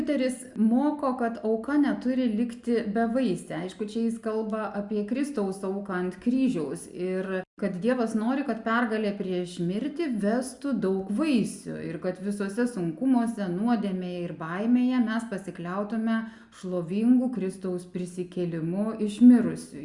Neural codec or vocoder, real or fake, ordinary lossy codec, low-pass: none; real; Opus, 64 kbps; 10.8 kHz